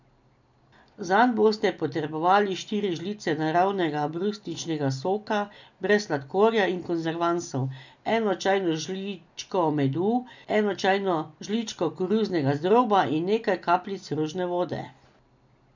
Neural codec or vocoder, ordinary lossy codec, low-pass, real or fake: none; none; 7.2 kHz; real